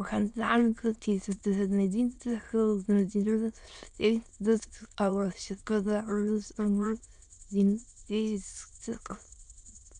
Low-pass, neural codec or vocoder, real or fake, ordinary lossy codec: 9.9 kHz; autoencoder, 22.05 kHz, a latent of 192 numbers a frame, VITS, trained on many speakers; fake; none